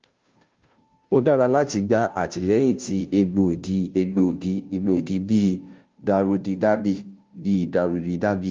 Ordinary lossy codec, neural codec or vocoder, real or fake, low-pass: Opus, 32 kbps; codec, 16 kHz, 0.5 kbps, FunCodec, trained on Chinese and English, 25 frames a second; fake; 7.2 kHz